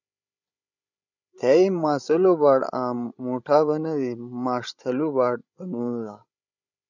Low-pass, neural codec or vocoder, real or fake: 7.2 kHz; codec, 16 kHz, 16 kbps, FreqCodec, larger model; fake